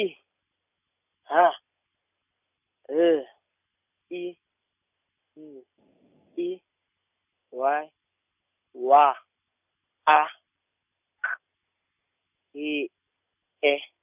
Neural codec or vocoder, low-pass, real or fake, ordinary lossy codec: none; 3.6 kHz; real; none